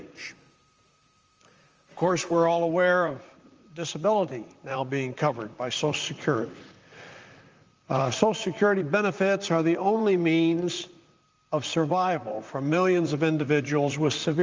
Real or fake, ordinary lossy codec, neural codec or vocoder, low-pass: fake; Opus, 24 kbps; vocoder, 44.1 kHz, 128 mel bands, Pupu-Vocoder; 7.2 kHz